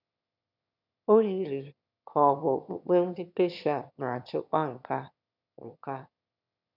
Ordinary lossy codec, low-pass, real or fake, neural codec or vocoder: none; 5.4 kHz; fake; autoencoder, 22.05 kHz, a latent of 192 numbers a frame, VITS, trained on one speaker